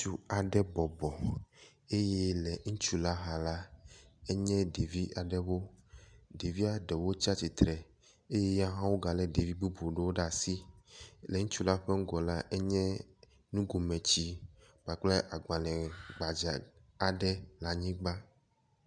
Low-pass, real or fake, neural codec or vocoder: 9.9 kHz; real; none